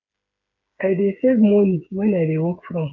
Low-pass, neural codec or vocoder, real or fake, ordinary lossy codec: 7.2 kHz; codec, 16 kHz, 8 kbps, FreqCodec, smaller model; fake; none